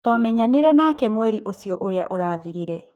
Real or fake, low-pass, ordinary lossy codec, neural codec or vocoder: fake; 19.8 kHz; none; codec, 44.1 kHz, 2.6 kbps, DAC